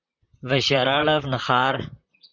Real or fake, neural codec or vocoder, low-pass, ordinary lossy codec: fake; vocoder, 44.1 kHz, 128 mel bands, Pupu-Vocoder; 7.2 kHz; Opus, 64 kbps